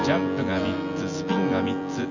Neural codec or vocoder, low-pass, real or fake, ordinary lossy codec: vocoder, 24 kHz, 100 mel bands, Vocos; 7.2 kHz; fake; none